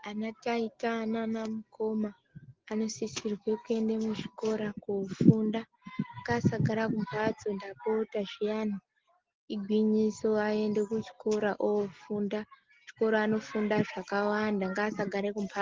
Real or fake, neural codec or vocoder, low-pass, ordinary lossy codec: real; none; 7.2 kHz; Opus, 16 kbps